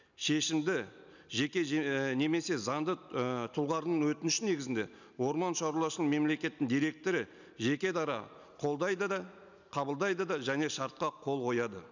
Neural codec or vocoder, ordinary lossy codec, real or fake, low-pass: none; none; real; 7.2 kHz